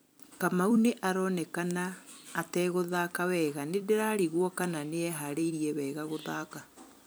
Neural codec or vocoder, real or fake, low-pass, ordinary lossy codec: vocoder, 44.1 kHz, 128 mel bands every 256 samples, BigVGAN v2; fake; none; none